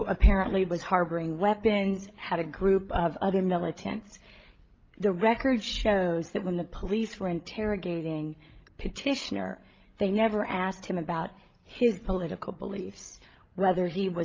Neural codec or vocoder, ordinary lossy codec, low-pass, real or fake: codec, 16 kHz, 16 kbps, FreqCodec, larger model; Opus, 24 kbps; 7.2 kHz; fake